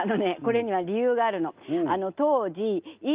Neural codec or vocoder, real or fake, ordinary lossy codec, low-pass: none; real; Opus, 24 kbps; 3.6 kHz